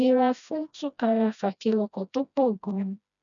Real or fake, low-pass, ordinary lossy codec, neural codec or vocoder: fake; 7.2 kHz; none; codec, 16 kHz, 1 kbps, FreqCodec, smaller model